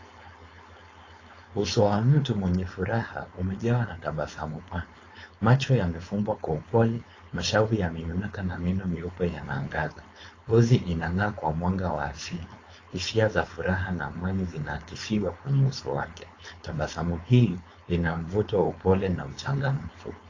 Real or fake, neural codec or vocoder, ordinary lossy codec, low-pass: fake; codec, 16 kHz, 4.8 kbps, FACodec; AAC, 32 kbps; 7.2 kHz